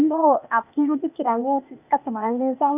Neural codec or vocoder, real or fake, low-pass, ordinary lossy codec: codec, 16 kHz, 0.8 kbps, ZipCodec; fake; 3.6 kHz; none